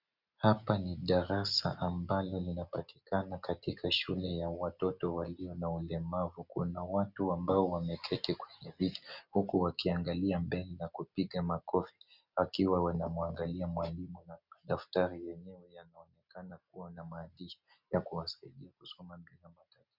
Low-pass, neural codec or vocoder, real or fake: 5.4 kHz; none; real